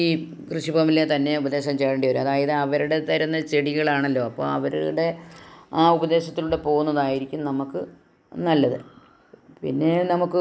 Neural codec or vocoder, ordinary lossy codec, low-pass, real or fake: none; none; none; real